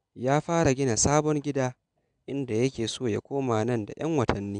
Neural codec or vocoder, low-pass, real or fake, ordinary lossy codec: none; 9.9 kHz; real; none